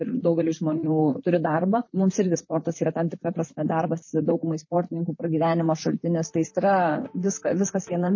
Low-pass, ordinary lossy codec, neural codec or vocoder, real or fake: 7.2 kHz; MP3, 32 kbps; vocoder, 44.1 kHz, 128 mel bands, Pupu-Vocoder; fake